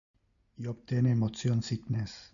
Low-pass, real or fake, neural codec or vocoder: 7.2 kHz; real; none